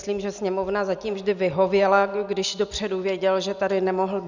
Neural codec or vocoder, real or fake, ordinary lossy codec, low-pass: none; real; Opus, 64 kbps; 7.2 kHz